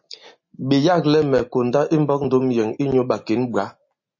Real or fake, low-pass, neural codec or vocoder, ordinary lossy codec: real; 7.2 kHz; none; MP3, 32 kbps